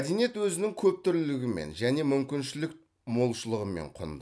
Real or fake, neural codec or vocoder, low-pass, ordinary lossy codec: real; none; none; none